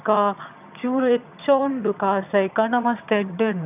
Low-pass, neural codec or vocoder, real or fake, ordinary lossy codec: 3.6 kHz; vocoder, 22.05 kHz, 80 mel bands, HiFi-GAN; fake; none